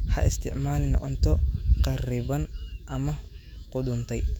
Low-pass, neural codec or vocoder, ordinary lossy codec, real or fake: 19.8 kHz; autoencoder, 48 kHz, 128 numbers a frame, DAC-VAE, trained on Japanese speech; none; fake